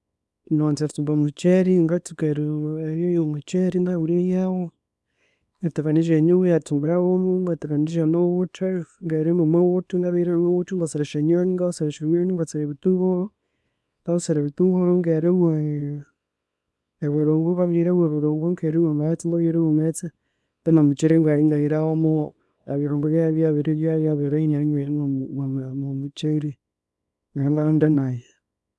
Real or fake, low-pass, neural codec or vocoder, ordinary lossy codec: fake; none; codec, 24 kHz, 0.9 kbps, WavTokenizer, small release; none